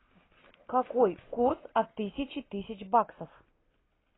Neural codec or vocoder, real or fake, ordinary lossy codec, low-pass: none; real; AAC, 16 kbps; 7.2 kHz